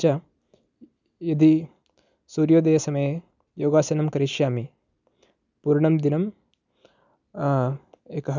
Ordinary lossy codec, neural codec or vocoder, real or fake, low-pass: none; none; real; 7.2 kHz